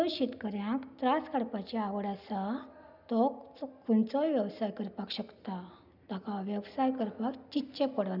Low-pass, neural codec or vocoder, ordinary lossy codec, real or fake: 5.4 kHz; none; none; real